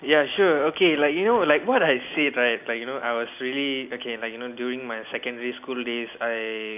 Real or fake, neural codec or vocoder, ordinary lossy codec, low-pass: real; none; none; 3.6 kHz